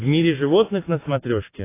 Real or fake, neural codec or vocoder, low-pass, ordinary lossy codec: real; none; 3.6 kHz; AAC, 24 kbps